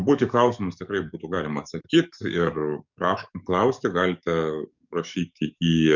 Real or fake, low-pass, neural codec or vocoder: fake; 7.2 kHz; codec, 44.1 kHz, 7.8 kbps, DAC